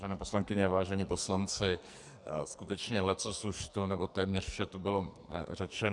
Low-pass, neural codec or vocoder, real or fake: 10.8 kHz; codec, 44.1 kHz, 2.6 kbps, SNAC; fake